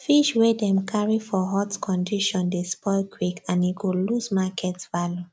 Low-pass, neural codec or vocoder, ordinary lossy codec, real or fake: none; none; none; real